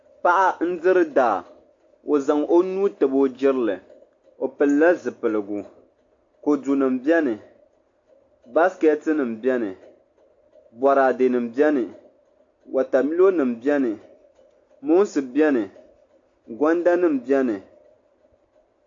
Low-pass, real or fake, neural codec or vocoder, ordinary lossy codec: 7.2 kHz; real; none; AAC, 48 kbps